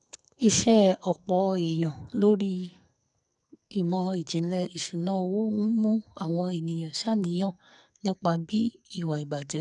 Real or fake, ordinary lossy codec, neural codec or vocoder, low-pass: fake; none; codec, 44.1 kHz, 2.6 kbps, SNAC; 10.8 kHz